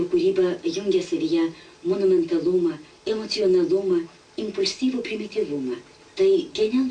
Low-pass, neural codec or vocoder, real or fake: 9.9 kHz; none; real